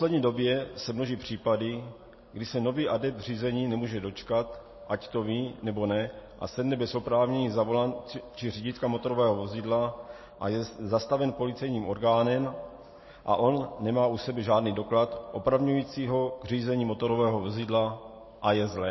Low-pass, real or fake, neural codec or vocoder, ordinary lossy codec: 7.2 kHz; real; none; MP3, 24 kbps